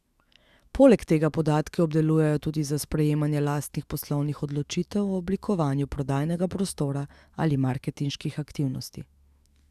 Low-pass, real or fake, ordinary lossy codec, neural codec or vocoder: 14.4 kHz; fake; Opus, 64 kbps; autoencoder, 48 kHz, 128 numbers a frame, DAC-VAE, trained on Japanese speech